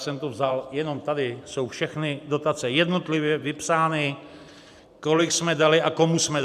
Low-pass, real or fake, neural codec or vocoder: 14.4 kHz; fake; vocoder, 44.1 kHz, 128 mel bands every 512 samples, BigVGAN v2